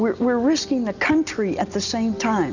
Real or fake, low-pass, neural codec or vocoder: real; 7.2 kHz; none